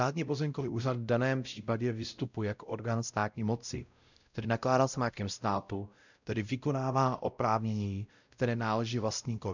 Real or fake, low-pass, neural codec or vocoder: fake; 7.2 kHz; codec, 16 kHz, 0.5 kbps, X-Codec, WavLM features, trained on Multilingual LibriSpeech